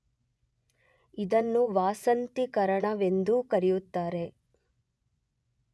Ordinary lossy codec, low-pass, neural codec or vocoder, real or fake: none; none; vocoder, 24 kHz, 100 mel bands, Vocos; fake